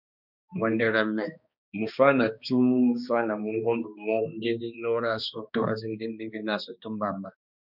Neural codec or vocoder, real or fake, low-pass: codec, 16 kHz, 2 kbps, X-Codec, HuBERT features, trained on balanced general audio; fake; 5.4 kHz